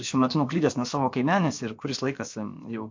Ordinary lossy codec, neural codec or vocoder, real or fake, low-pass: MP3, 48 kbps; codec, 16 kHz, about 1 kbps, DyCAST, with the encoder's durations; fake; 7.2 kHz